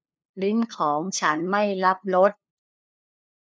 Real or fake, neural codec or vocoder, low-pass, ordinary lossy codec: fake; codec, 16 kHz, 2 kbps, FunCodec, trained on LibriTTS, 25 frames a second; none; none